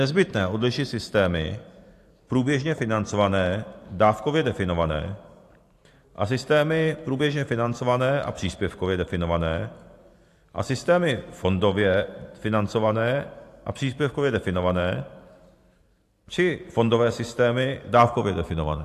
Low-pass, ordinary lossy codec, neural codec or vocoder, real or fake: 14.4 kHz; AAC, 64 kbps; vocoder, 44.1 kHz, 128 mel bands every 512 samples, BigVGAN v2; fake